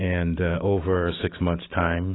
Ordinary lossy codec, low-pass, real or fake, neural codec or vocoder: AAC, 16 kbps; 7.2 kHz; fake; autoencoder, 48 kHz, 128 numbers a frame, DAC-VAE, trained on Japanese speech